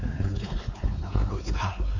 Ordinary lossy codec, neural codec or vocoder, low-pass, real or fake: MP3, 48 kbps; codec, 16 kHz, 4 kbps, X-Codec, WavLM features, trained on Multilingual LibriSpeech; 7.2 kHz; fake